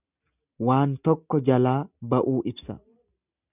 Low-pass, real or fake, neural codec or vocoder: 3.6 kHz; real; none